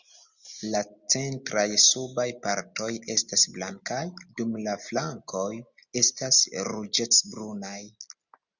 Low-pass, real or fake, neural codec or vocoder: 7.2 kHz; real; none